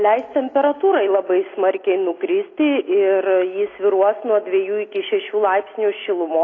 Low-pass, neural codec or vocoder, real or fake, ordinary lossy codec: 7.2 kHz; none; real; AAC, 32 kbps